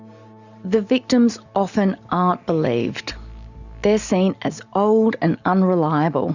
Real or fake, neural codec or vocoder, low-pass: real; none; 7.2 kHz